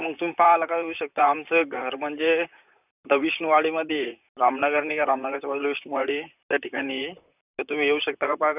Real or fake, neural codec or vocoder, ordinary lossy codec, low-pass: fake; vocoder, 44.1 kHz, 128 mel bands, Pupu-Vocoder; none; 3.6 kHz